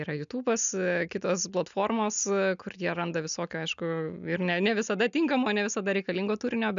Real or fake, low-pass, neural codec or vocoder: real; 7.2 kHz; none